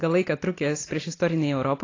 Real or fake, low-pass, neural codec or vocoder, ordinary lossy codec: real; 7.2 kHz; none; AAC, 32 kbps